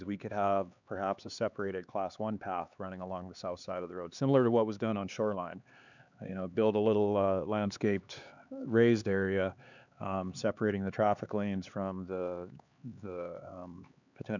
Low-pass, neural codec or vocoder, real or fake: 7.2 kHz; codec, 16 kHz, 4 kbps, X-Codec, HuBERT features, trained on LibriSpeech; fake